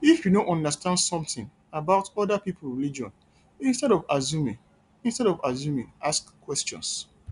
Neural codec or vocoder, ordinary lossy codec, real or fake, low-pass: none; none; real; 10.8 kHz